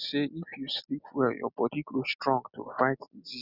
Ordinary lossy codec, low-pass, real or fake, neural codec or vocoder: none; 5.4 kHz; real; none